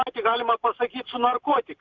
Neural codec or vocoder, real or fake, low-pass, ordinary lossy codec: vocoder, 44.1 kHz, 128 mel bands every 512 samples, BigVGAN v2; fake; 7.2 kHz; Opus, 64 kbps